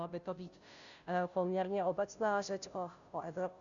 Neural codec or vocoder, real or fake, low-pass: codec, 16 kHz, 0.5 kbps, FunCodec, trained on Chinese and English, 25 frames a second; fake; 7.2 kHz